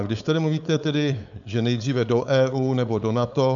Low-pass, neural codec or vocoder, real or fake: 7.2 kHz; codec, 16 kHz, 4.8 kbps, FACodec; fake